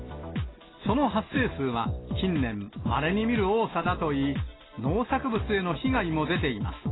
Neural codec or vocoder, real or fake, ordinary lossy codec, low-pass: none; real; AAC, 16 kbps; 7.2 kHz